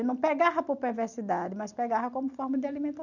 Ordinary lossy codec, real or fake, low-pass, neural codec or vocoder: none; real; 7.2 kHz; none